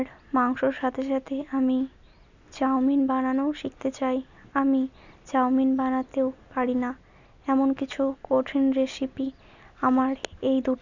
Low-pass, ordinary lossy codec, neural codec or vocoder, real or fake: 7.2 kHz; none; none; real